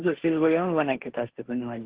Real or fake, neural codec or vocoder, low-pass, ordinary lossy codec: fake; codec, 16 kHz, 1.1 kbps, Voila-Tokenizer; 3.6 kHz; Opus, 16 kbps